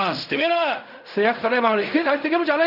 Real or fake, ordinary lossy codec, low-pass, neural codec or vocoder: fake; none; 5.4 kHz; codec, 16 kHz in and 24 kHz out, 0.4 kbps, LongCat-Audio-Codec, fine tuned four codebook decoder